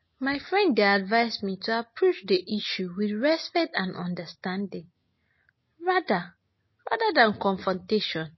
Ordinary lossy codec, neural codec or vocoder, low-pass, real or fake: MP3, 24 kbps; none; 7.2 kHz; real